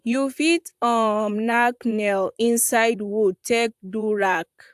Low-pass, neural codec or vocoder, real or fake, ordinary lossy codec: 14.4 kHz; vocoder, 44.1 kHz, 128 mel bands, Pupu-Vocoder; fake; AAC, 96 kbps